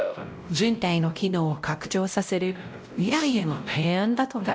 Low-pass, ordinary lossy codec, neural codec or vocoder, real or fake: none; none; codec, 16 kHz, 0.5 kbps, X-Codec, WavLM features, trained on Multilingual LibriSpeech; fake